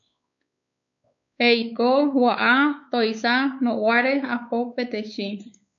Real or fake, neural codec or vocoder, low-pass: fake; codec, 16 kHz, 4 kbps, X-Codec, WavLM features, trained on Multilingual LibriSpeech; 7.2 kHz